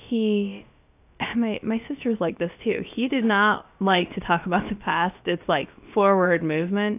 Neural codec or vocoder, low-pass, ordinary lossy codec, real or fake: codec, 16 kHz, about 1 kbps, DyCAST, with the encoder's durations; 3.6 kHz; AAC, 32 kbps; fake